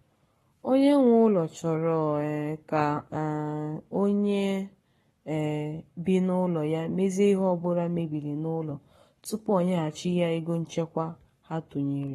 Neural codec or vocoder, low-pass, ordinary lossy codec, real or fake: codec, 44.1 kHz, 7.8 kbps, Pupu-Codec; 19.8 kHz; AAC, 32 kbps; fake